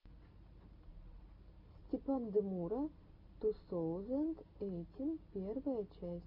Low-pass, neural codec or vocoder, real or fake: 5.4 kHz; none; real